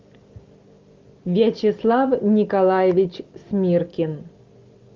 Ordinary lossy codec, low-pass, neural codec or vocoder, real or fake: Opus, 24 kbps; 7.2 kHz; none; real